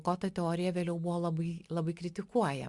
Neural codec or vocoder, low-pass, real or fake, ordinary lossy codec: none; 10.8 kHz; real; Opus, 64 kbps